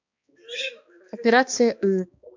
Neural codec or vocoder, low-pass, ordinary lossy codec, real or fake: codec, 16 kHz, 2 kbps, X-Codec, HuBERT features, trained on balanced general audio; 7.2 kHz; MP3, 48 kbps; fake